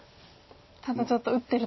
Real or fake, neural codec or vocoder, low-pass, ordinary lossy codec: real; none; 7.2 kHz; MP3, 24 kbps